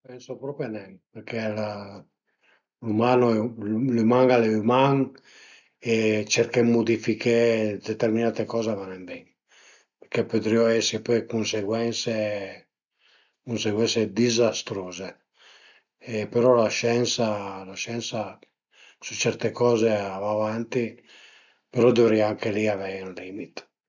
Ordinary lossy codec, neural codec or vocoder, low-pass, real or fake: none; none; 7.2 kHz; real